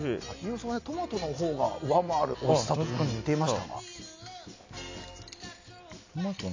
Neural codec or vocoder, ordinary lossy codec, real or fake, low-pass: none; AAC, 48 kbps; real; 7.2 kHz